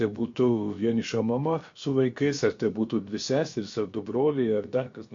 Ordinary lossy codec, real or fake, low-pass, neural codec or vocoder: MP3, 48 kbps; fake; 7.2 kHz; codec, 16 kHz, about 1 kbps, DyCAST, with the encoder's durations